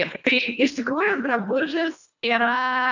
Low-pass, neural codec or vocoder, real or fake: 7.2 kHz; codec, 24 kHz, 1.5 kbps, HILCodec; fake